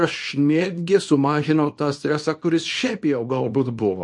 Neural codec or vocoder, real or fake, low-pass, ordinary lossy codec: codec, 24 kHz, 0.9 kbps, WavTokenizer, small release; fake; 10.8 kHz; MP3, 48 kbps